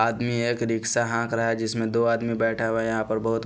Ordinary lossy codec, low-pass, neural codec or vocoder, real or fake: none; none; none; real